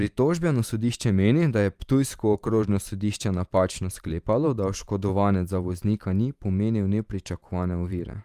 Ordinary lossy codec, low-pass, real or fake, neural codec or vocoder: Opus, 32 kbps; 14.4 kHz; fake; vocoder, 44.1 kHz, 128 mel bands every 512 samples, BigVGAN v2